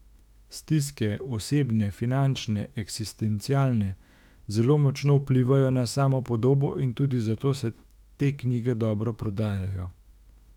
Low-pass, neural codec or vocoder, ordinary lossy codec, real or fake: 19.8 kHz; autoencoder, 48 kHz, 32 numbers a frame, DAC-VAE, trained on Japanese speech; none; fake